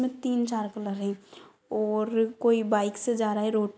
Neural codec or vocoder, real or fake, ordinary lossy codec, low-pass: none; real; none; none